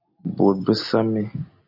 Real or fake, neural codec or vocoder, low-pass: real; none; 5.4 kHz